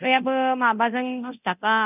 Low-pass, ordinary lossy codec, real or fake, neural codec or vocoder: 3.6 kHz; none; fake; codec, 24 kHz, 0.9 kbps, DualCodec